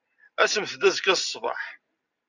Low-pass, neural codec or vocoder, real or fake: 7.2 kHz; none; real